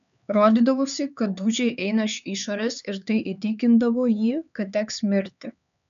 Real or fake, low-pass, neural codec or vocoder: fake; 7.2 kHz; codec, 16 kHz, 4 kbps, X-Codec, HuBERT features, trained on LibriSpeech